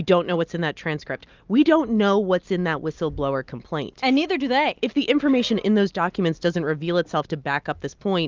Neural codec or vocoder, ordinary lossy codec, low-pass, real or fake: none; Opus, 24 kbps; 7.2 kHz; real